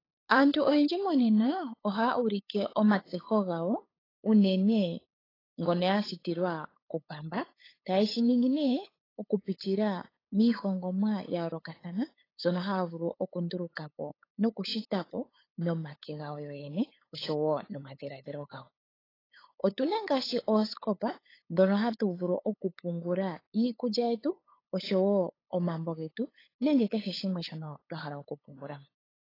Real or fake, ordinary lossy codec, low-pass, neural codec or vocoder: fake; AAC, 24 kbps; 5.4 kHz; codec, 16 kHz, 8 kbps, FunCodec, trained on LibriTTS, 25 frames a second